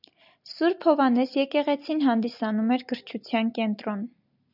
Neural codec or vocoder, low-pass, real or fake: none; 5.4 kHz; real